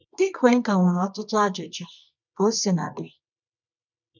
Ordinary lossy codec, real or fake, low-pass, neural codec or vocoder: none; fake; 7.2 kHz; codec, 24 kHz, 0.9 kbps, WavTokenizer, medium music audio release